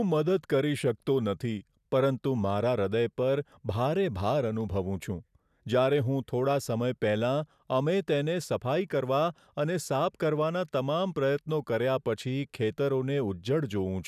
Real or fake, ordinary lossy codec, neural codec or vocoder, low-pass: real; none; none; 14.4 kHz